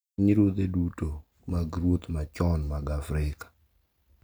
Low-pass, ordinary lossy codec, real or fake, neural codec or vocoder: none; none; real; none